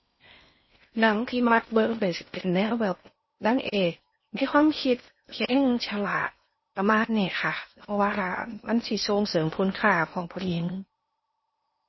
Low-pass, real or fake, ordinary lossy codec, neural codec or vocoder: 7.2 kHz; fake; MP3, 24 kbps; codec, 16 kHz in and 24 kHz out, 0.6 kbps, FocalCodec, streaming, 4096 codes